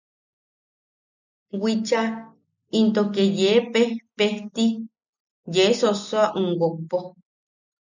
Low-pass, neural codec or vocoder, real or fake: 7.2 kHz; none; real